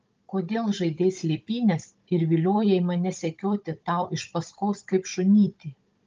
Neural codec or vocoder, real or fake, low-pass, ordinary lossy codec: codec, 16 kHz, 16 kbps, FunCodec, trained on Chinese and English, 50 frames a second; fake; 7.2 kHz; Opus, 24 kbps